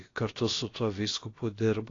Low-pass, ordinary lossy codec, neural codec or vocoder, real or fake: 7.2 kHz; AAC, 32 kbps; codec, 16 kHz, about 1 kbps, DyCAST, with the encoder's durations; fake